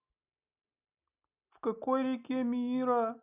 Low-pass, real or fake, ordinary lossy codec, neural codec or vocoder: 3.6 kHz; real; none; none